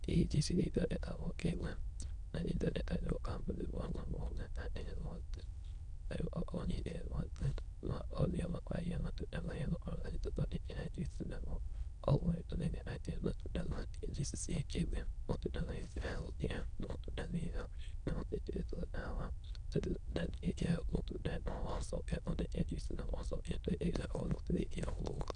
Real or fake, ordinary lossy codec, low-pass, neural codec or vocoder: fake; none; 9.9 kHz; autoencoder, 22.05 kHz, a latent of 192 numbers a frame, VITS, trained on many speakers